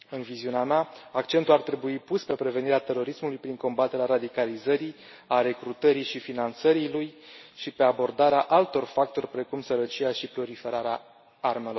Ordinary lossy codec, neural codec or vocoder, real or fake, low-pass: MP3, 24 kbps; none; real; 7.2 kHz